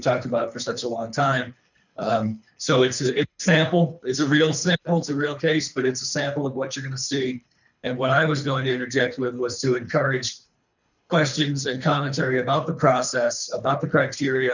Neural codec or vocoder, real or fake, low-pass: codec, 24 kHz, 3 kbps, HILCodec; fake; 7.2 kHz